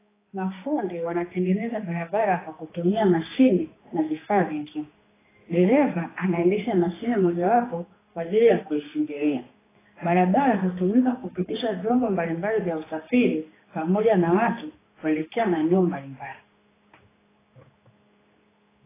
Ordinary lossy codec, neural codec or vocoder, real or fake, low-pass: AAC, 16 kbps; codec, 16 kHz, 2 kbps, X-Codec, HuBERT features, trained on general audio; fake; 3.6 kHz